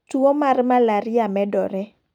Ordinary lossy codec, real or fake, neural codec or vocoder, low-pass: none; real; none; 19.8 kHz